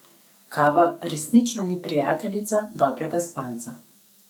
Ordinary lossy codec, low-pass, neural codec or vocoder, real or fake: none; none; codec, 44.1 kHz, 2.6 kbps, SNAC; fake